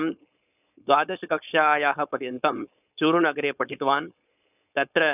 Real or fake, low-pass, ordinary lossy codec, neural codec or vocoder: fake; 3.6 kHz; none; codec, 16 kHz, 4.8 kbps, FACodec